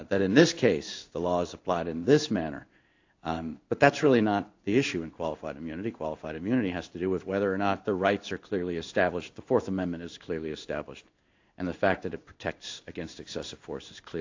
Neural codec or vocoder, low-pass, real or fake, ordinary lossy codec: codec, 16 kHz in and 24 kHz out, 1 kbps, XY-Tokenizer; 7.2 kHz; fake; AAC, 48 kbps